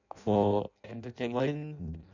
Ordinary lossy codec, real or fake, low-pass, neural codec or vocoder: none; fake; 7.2 kHz; codec, 16 kHz in and 24 kHz out, 0.6 kbps, FireRedTTS-2 codec